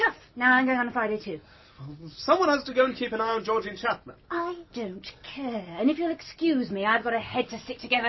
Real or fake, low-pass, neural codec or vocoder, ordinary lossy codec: real; 7.2 kHz; none; MP3, 24 kbps